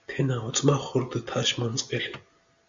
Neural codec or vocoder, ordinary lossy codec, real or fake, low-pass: none; Opus, 64 kbps; real; 7.2 kHz